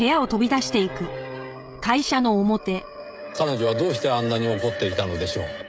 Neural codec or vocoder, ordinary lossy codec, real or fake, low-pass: codec, 16 kHz, 16 kbps, FreqCodec, smaller model; none; fake; none